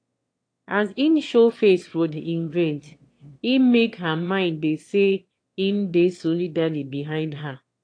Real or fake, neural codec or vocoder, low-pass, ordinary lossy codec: fake; autoencoder, 22.05 kHz, a latent of 192 numbers a frame, VITS, trained on one speaker; 9.9 kHz; AAC, 48 kbps